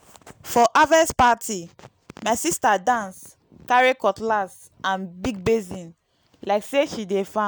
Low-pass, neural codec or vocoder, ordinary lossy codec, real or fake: none; none; none; real